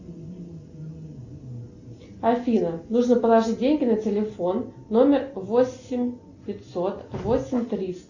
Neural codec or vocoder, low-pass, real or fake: none; 7.2 kHz; real